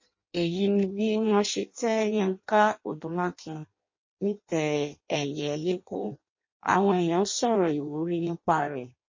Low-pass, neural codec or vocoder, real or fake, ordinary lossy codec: 7.2 kHz; codec, 16 kHz in and 24 kHz out, 0.6 kbps, FireRedTTS-2 codec; fake; MP3, 32 kbps